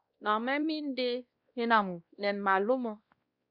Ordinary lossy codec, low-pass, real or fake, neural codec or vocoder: Opus, 64 kbps; 5.4 kHz; fake; codec, 16 kHz, 1 kbps, X-Codec, WavLM features, trained on Multilingual LibriSpeech